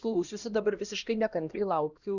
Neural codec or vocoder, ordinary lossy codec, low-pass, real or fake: codec, 16 kHz, 1 kbps, X-Codec, HuBERT features, trained on LibriSpeech; Opus, 64 kbps; 7.2 kHz; fake